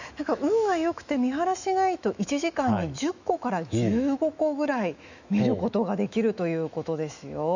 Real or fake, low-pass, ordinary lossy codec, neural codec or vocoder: fake; 7.2 kHz; none; autoencoder, 48 kHz, 128 numbers a frame, DAC-VAE, trained on Japanese speech